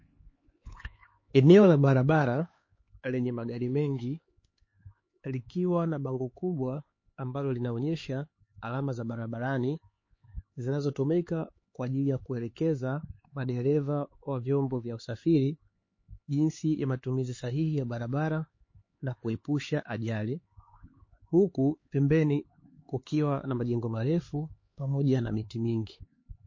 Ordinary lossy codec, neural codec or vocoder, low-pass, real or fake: MP3, 32 kbps; codec, 16 kHz, 4 kbps, X-Codec, HuBERT features, trained on LibriSpeech; 7.2 kHz; fake